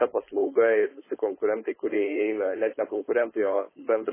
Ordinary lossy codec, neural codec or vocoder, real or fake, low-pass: MP3, 16 kbps; codec, 16 kHz, 4.8 kbps, FACodec; fake; 3.6 kHz